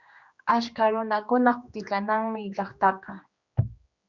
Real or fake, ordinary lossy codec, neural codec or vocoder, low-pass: fake; Opus, 64 kbps; codec, 16 kHz, 2 kbps, X-Codec, HuBERT features, trained on general audio; 7.2 kHz